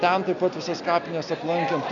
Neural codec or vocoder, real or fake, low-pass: none; real; 7.2 kHz